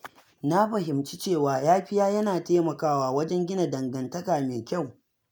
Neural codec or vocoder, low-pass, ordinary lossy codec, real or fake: none; none; none; real